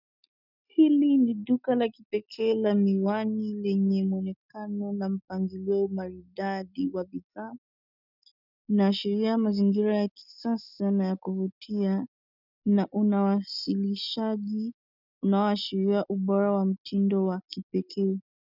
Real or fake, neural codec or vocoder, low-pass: real; none; 5.4 kHz